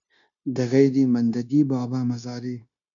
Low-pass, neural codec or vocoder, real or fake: 7.2 kHz; codec, 16 kHz, 0.9 kbps, LongCat-Audio-Codec; fake